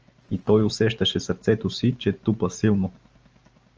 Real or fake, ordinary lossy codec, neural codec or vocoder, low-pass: real; Opus, 24 kbps; none; 7.2 kHz